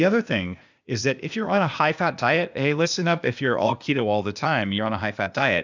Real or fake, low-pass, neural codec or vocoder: fake; 7.2 kHz; codec, 16 kHz, 0.8 kbps, ZipCodec